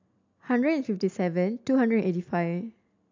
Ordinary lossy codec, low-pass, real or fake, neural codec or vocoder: AAC, 48 kbps; 7.2 kHz; real; none